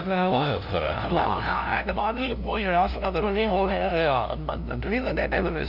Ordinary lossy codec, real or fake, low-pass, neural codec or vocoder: none; fake; 5.4 kHz; codec, 16 kHz, 0.5 kbps, FunCodec, trained on LibriTTS, 25 frames a second